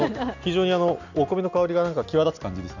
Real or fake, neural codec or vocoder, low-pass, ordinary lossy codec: real; none; 7.2 kHz; none